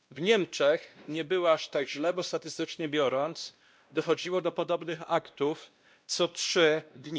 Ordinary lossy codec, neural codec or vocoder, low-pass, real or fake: none; codec, 16 kHz, 1 kbps, X-Codec, WavLM features, trained on Multilingual LibriSpeech; none; fake